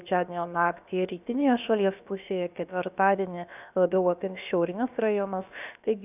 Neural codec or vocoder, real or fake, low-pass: codec, 16 kHz, about 1 kbps, DyCAST, with the encoder's durations; fake; 3.6 kHz